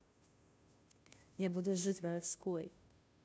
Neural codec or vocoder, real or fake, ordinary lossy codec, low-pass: codec, 16 kHz, 0.5 kbps, FunCodec, trained on Chinese and English, 25 frames a second; fake; none; none